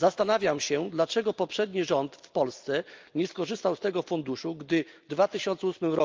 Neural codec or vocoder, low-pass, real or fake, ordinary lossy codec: none; 7.2 kHz; real; Opus, 24 kbps